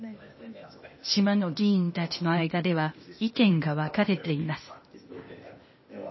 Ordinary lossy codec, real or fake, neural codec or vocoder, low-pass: MP3, 24 kbps; fake; codec, 16 kHz, 0.8 kbps, ZipCodec; 7.2 kHz